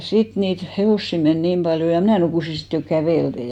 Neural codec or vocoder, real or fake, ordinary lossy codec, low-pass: none; real; none; 19.8 kHz